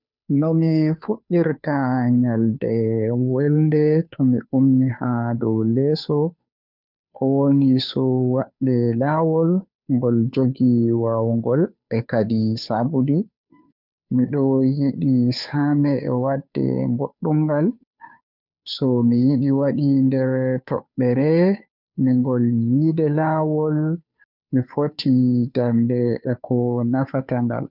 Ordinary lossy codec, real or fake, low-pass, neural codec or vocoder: none; fake; 5.4 kHz; codec, 16 kHz, 2 kbps, FunCodec, trained on Chinese and English, 25 frames a second